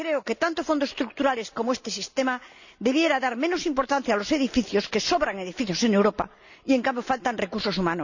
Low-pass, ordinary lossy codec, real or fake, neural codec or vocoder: 7.2 kHz; none; real; none